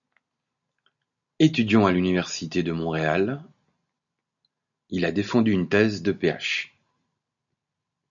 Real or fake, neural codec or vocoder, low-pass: real; none; 7.2 kHz